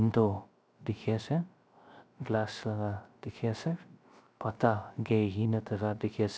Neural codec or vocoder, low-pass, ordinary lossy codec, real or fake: codec, 16 kHz, 0.3 kbps, FocalCodec; none; none; fake